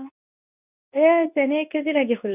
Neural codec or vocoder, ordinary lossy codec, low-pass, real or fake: codec, 24 kHz, 0.9 kbps, WavTokenizer, medium speech release version 2; none; 3.6 kHz; fake